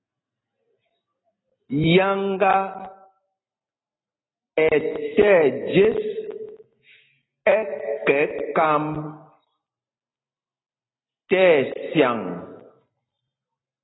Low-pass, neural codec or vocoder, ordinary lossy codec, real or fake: 7.2 kHz; none; AAC, 16 kbps; real